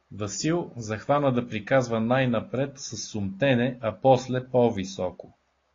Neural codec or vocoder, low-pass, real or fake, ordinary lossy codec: none; 7.2 kHz; real; AAC, 32 kbps